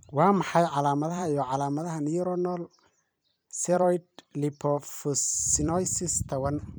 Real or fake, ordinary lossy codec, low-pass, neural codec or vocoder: fake; none; none; vocoder, 44.1 kHz, 128 mel bands every 256 samples, BigVGAN v2